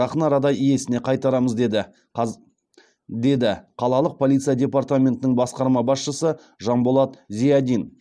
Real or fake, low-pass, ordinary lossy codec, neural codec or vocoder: real; none; none; none